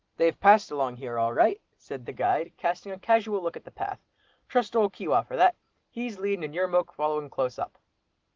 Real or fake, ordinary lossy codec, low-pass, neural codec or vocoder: real; Opus, 16 kbps; 7.2 kHz; none